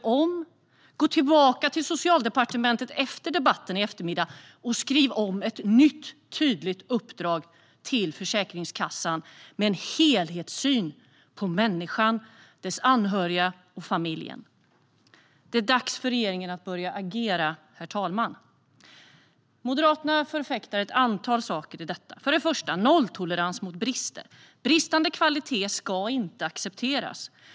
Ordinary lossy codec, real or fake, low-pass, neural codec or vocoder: none; real; none; none